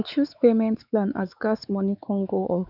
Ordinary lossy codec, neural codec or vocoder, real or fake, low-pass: none; codec, 16 kHz, 4 kbps, X-Codec, WavLM features, trained on Multilingual LibriSpeech; fake; 5.4 kHz